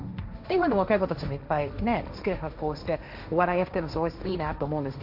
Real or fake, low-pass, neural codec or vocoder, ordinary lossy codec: fake; 5.4 kHz; codec, 16 kHz, 1.1 kbps, Voila-Tokenizer; AAC, 48 kbps